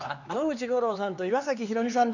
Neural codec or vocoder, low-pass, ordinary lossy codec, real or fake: codec, 16 kHz, 4 kbps, X-Codec, HuBERT features, trained on LibriSpeech; 7.2 kHz; none; fake